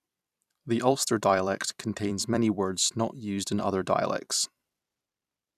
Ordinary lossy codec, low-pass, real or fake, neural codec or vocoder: none; 14.4 kHz; fake; vocoder, 44.1 kHz, 128 mel bands every 256 samples, BigVGAN v2